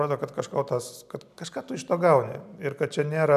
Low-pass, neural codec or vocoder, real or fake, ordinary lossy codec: 14.4 kHz; none; real; AAC, 96 kbps